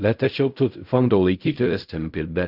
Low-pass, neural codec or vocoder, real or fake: 5.4 kHz; codec, 16 kHz in and 24 kHz out, 0.4 kbps, LongCat-Audio-Codec, fine tuned four codebook decoder; fake